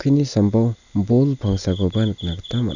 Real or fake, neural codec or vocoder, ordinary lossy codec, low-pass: real; none; none; 7.2 kHz